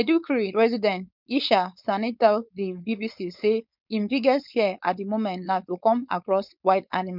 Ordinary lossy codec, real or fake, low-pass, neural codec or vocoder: none; fake; 5.4 kHz; codec, 16 kHz, 4.8 kbps, FACodec